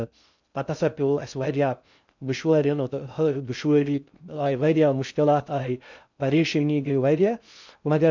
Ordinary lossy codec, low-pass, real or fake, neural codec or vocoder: none; 7.2 kHz; fake; codec, 16 kHz in and 24 kHz out, 0.6 kbps, FocalCodec, streaming, 2048 codes